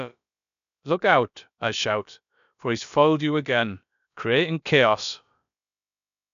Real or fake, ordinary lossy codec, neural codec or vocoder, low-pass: fake; MP3, 96 kbps; codec, 16 kHz, about 1 kbps, DyCAST, with the encoder's durations; 7.2 kHz